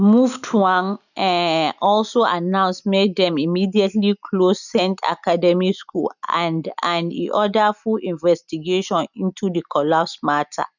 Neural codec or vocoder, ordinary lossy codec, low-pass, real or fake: none; none; 7.2 kHz; real